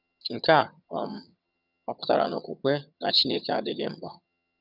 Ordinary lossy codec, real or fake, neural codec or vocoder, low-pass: none; fake; vocoder, 22.05 kHz, 80 mel bands, HiFi-GAN; 5.4 kHz